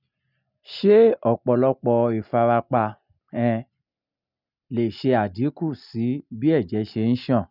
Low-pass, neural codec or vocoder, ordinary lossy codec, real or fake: 5.4 kHz; none; none; real